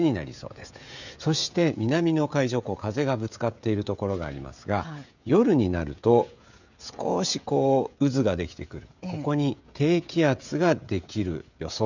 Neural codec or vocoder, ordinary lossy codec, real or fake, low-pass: codec, 16 kHz, 16 kbps, FreqCodec, smaller model; none; fake; 7.2 kHz